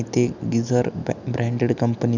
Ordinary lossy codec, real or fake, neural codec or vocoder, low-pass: none; real; none; 7.2 kHz